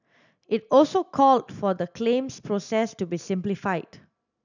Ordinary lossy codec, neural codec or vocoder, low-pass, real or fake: none; none; 7.2 kHz; real